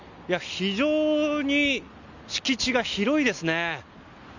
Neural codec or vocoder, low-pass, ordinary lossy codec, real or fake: none; 7.2 kHz; none; real